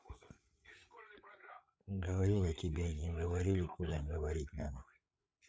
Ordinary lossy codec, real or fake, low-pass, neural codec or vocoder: none; fake; none; codec, 16 kHz, 8 kbps, FreqCodec, larger model